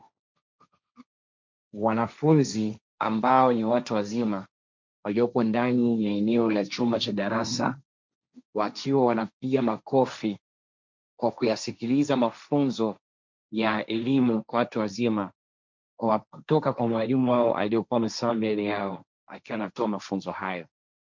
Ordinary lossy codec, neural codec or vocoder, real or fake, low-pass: MP3, 64 kbps; codec, 16 kHz, 1.1 kbps, Voila-Tokenizer; fake; 7.2 kHz